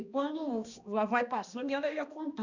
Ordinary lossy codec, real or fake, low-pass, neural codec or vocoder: none; fake; 7.2 kHz; codec, 16 kHz, 1 kbps, X-Codec, HuBERT features, trained on balanced general audio